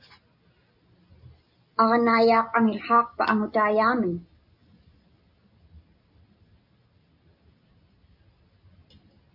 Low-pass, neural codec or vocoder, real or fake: 5.4 kHz; none; real